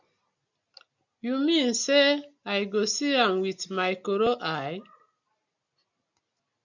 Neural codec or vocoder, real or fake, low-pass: none; real; 7.2 kHz